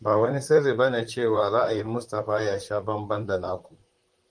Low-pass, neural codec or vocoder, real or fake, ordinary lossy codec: 9.9 kHz; vocoder, 44.1 kHz, 128 mel bands, Pupu-Vocoder; fake; Opus, 32 kbps